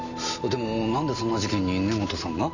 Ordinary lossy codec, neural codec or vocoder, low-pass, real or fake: none; none; 7.2 kHz; real